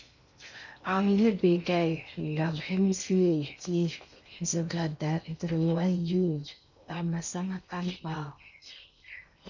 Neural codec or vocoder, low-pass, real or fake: codec, 16 kHz in and 24 kHz out, 0.6 kbps, FocalCodec, streaming, 2048 codes; 7.2 kHz; fake